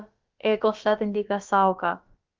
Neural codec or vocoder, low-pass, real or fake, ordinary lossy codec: codec, 16 kHz, about 1 kbps, DyCAST, with the encoder's durations; 7.2 kHz; fake; Opus, 32 kbps